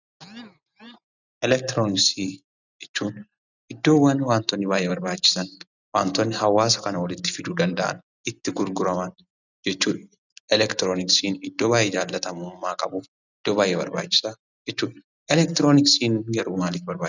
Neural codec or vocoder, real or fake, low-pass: none; real; 7.2 kHz